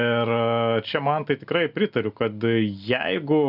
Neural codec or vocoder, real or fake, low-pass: none; real; 5.4 kHz